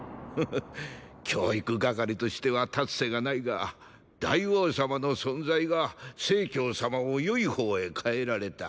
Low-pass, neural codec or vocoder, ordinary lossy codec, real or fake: none; none; none; real